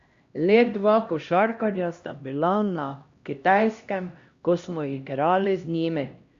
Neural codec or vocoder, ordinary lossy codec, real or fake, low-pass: codec, 16 kHz, 1 kbps, X-Codec, HuBERT features, trained on LibriSpeech; Opus, 64 kbps; fake; 7.2 kHz